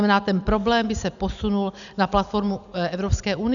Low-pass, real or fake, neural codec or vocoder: 7.2 kHz; real; none